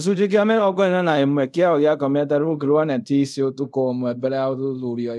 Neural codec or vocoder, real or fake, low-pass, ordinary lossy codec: codec, 24 kHz, 0.5 kbps, DualCodec; fake; none; none